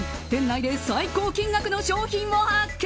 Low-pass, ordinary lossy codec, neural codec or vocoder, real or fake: none; none; none; real